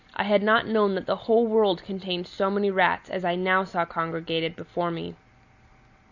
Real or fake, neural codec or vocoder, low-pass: real; none; 7.2 kHz